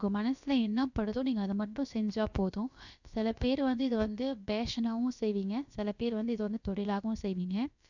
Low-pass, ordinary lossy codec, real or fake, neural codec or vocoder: 7.2 kHz; none; fake; codec, 16 kHz, about 1 kbps, DyCAST, with the encoder's durations